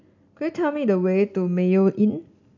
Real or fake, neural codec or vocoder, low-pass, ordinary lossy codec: real; none; 7.2 kHz; none